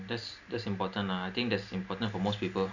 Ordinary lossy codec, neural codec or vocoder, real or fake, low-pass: none; none; real; 7.2 kHz